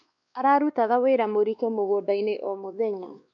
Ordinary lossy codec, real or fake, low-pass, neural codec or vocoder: none; fake; 7.2 kHz; codec, 16 kHz, 2 kbps, X-Codec, WavLM features, trained on Multilingual LibriSpeech